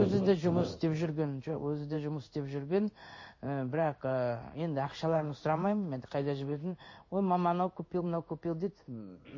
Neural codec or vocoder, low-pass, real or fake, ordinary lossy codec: codec, 16 kHz in and 24 kHz out, 1 kbps, XY-Tokenizer; 7.2 kHz; fake; MP3, 32 kbps